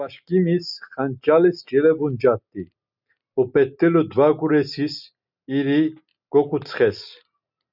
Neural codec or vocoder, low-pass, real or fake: none; 5.4 kHz; real